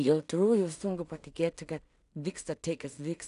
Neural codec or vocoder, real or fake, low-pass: codec, 16 kHz in and 24 kHz out, 0.4 kbps, LongCat-Audio-Codec, two codebook decoder; fake; 10.8 kHz